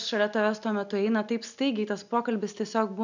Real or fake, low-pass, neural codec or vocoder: real; 7.2 kHz; none